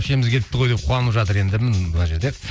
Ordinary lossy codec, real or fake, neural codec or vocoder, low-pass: none; real; none; none